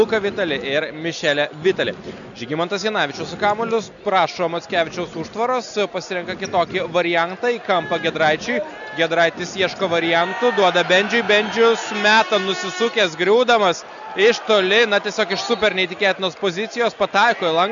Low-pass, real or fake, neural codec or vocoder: 7.2 kHz; real; none